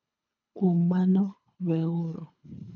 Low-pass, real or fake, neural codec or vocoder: 7.2 kHz; fake; codec, 24 kHz, 3 kbps, HILCodec